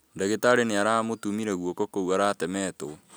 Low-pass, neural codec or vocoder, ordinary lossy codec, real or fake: none; none; none; real